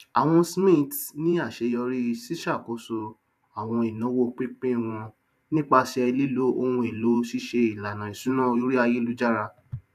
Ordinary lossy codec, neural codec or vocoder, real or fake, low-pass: none; vocoder, 44.1 kHz, 128 mel bands every 512 samples, BigVGAN v2; fake; 14.4 kHz